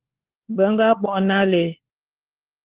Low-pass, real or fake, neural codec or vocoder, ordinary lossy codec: 3.6 kHz; fake; codec, 16 kHz, 4 kbps, FunCodec, trained on LibriTTS, 50 frames a second; Opus, 16 kbps